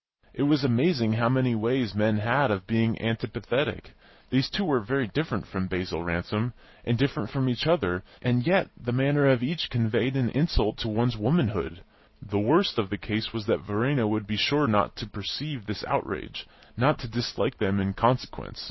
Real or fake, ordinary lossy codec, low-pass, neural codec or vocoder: real; MP3, 24 kbps; 7.2 kHz; none